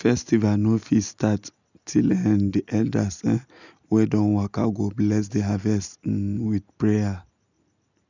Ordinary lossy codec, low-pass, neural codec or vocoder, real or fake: none; 7.2 kHz; none; real